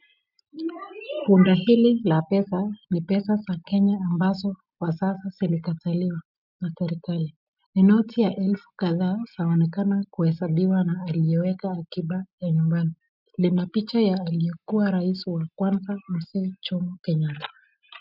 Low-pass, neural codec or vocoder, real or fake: 5.4 kHz; none; real